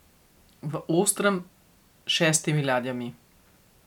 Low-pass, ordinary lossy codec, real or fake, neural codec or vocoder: 19.8 kHz; none; real; none